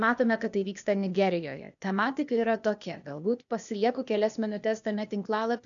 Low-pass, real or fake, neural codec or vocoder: 7.2 kHz; fake; codec, 16 kHz, 0.8 kbps, ZipCodec